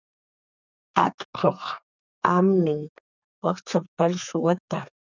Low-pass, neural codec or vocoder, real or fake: 7.2 kHz; codec, 32 kHz, 1.9 kbps, SNAC; fake